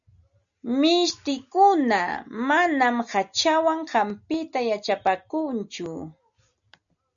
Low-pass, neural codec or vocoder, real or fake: 7.2 kHz; none; real